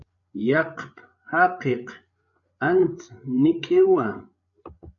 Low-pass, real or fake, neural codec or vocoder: 7.2 kHz; fake; codec, 16 kHz, 8 kbps, FreqCodec, larger model